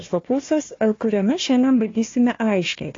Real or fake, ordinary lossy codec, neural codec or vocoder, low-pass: fake; AAC, 32 kbps; codec, 16 kHz, 1 kbps, FunCodec, trained on Chinese and English, 50 frames a second; 7.2 kHz